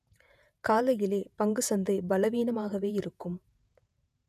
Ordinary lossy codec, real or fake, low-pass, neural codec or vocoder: none; fake; 14.4 kHz; vocoder, 48 kHz, 128 mel bands, Vocos